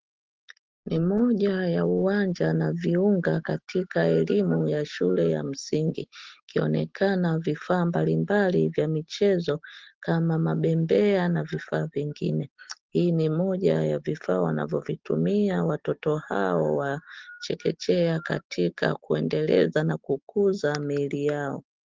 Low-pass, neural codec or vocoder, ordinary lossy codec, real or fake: 7.2 kHz; none; Opus, 16 kbps; real